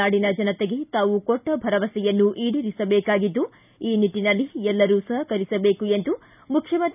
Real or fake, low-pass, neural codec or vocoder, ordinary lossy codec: real; 3.6 kHz; none; none